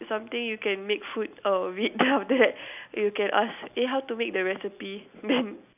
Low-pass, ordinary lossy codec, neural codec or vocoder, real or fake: 3.6 kHz; none; none; real